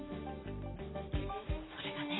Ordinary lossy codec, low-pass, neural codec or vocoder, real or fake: AAC, 16 kbps; 7.2 kHz; none; real